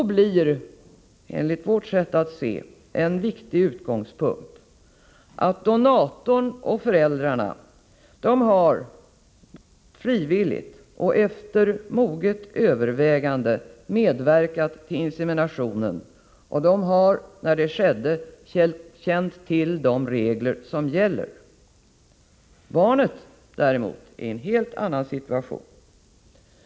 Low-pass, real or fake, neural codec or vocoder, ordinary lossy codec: none; real; none; none